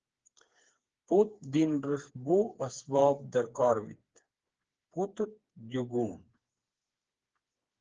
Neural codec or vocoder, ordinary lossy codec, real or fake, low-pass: codec, 16 kHz, 4 kbps, FreqCodec, smaller model; Opus, 16 kbps; fake; 7.2 kHz